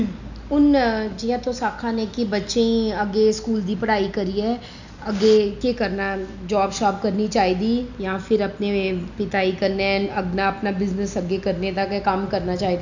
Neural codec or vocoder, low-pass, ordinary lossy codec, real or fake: none; 7.2 kHz; none; real